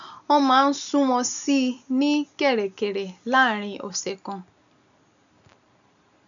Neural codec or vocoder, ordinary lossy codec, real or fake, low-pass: none; none; real; 7.2 kHz